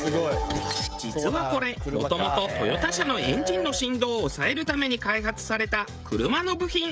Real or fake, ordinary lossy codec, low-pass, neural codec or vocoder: fake; none; none; codec, 16 kHz, 16 kbps, FreqCodec, smaller model